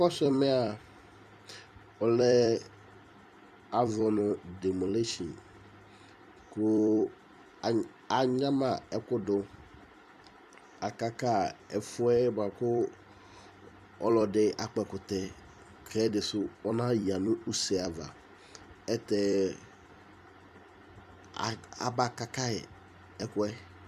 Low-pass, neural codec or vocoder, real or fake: 14.4 kHz; vocoder, 44.1 kHz, 128 mel bands every 256 samples, BigVGAN v2; fake